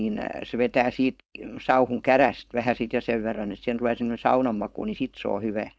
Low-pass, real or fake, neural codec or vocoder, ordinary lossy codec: none; fake; codec, 16 kHz, 4.8 kbps, FACodec; none